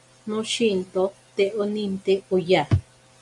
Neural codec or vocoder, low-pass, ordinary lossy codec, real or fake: none; 10.8 kHz; MP3, 64 kbps; real